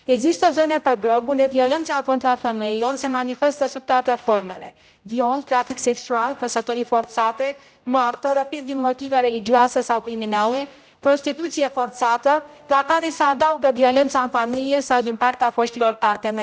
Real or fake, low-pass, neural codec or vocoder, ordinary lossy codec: fake; none; codec, 16 kHz, 0.5 kbps, X-Codec, HuBERT features, trained on general audio; none